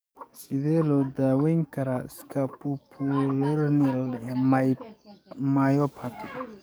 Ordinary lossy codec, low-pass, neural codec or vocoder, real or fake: none; none; codec, 44.1 kHz, 7.8 kbps, DAC; fake